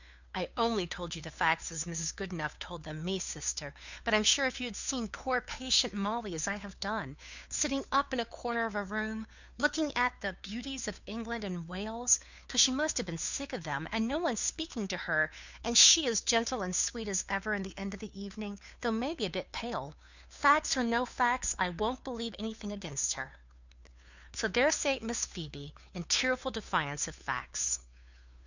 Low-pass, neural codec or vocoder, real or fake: 7.2 kHz; codec, 16 kHz, 2 kbps, FunCodec, trained on Chinese and English, 25 frames a second; fake